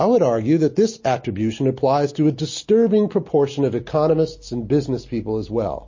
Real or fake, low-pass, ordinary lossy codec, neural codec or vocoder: real; 7.2 kHz; MP3, 32 kbps; none